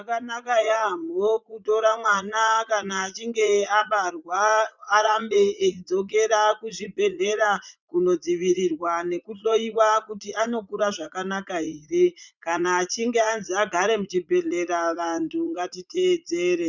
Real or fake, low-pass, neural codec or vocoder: fake; 7.2 kHz; vocoder, 44.1 kHz, 80 mel bands, Vocos